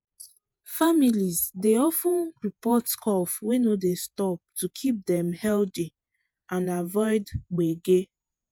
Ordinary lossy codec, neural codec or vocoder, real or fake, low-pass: none; vocoder, 48 kHz, 128 mel bands, Vocos; fake; none